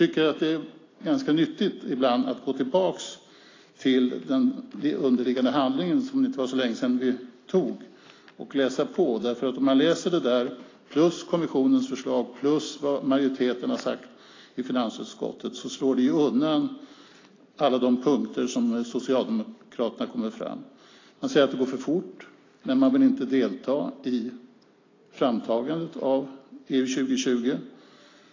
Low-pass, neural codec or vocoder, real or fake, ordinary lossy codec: 7.2 kHz; none; real; AAC, 32 kbps